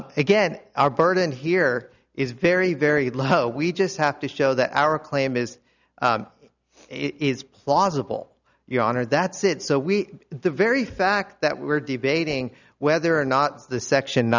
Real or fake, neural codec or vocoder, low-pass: real; none; 7.2 kHz